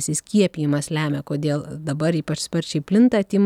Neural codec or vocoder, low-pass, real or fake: none; 19.8 kHz; real